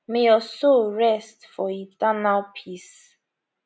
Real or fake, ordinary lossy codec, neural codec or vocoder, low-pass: real; none; none; none